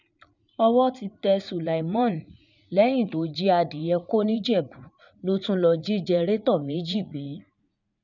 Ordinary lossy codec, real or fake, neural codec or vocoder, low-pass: none; fake; vocoder, 44.1 kHz, 80 mel bands, Vocos; 7.2 kHz